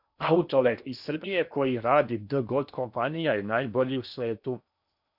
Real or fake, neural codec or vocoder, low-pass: fake; codec, 16 kHz in and 24 kHz out, 0.8 kbps, FocalCodec, streaming, 65536 codes; 5.4 kHz